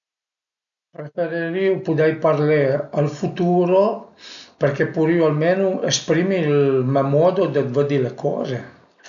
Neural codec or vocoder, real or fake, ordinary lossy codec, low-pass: none; real; none; 7.2 kHz